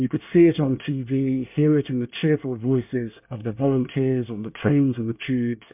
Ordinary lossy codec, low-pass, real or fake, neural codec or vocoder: MP3, 32 kbps; 3.6 kHz; fake; codec, 24 kHz, 1 kbps, SNAC